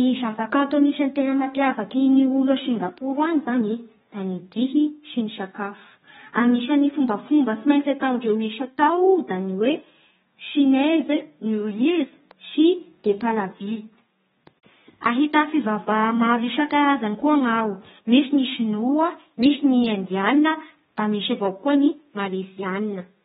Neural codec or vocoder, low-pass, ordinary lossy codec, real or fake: codec, 32 kHz, 1.9 kbps, SNAC; 14.4 kHz; AAC, 16 kbps; fake